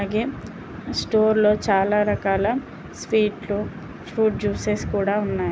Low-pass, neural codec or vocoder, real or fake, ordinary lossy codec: none; none; real; none